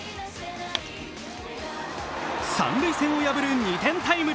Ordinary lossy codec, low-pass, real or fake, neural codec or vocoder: none; none; real; none